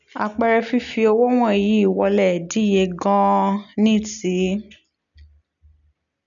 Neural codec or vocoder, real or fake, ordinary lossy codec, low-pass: none; real; none; 7.2 kHz